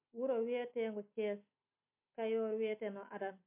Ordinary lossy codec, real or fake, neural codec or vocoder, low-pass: MP3, 24 kbps; real; none; 3.6 kHz